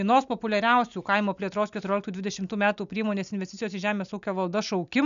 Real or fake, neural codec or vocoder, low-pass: real; none; 7.2 kHz